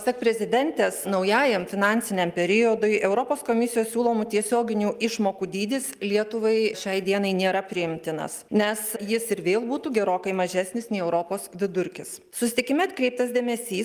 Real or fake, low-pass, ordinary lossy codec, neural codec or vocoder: real; 14.4 kHz; Opus, 32 kbps; none